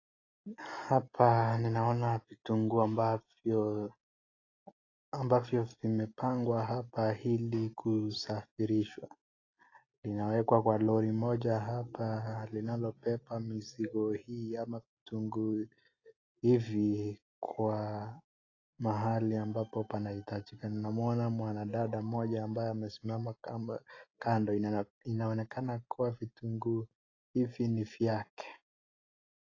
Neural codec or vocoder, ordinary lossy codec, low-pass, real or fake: none; AAC, 32 kbps; 7.2 kHz; real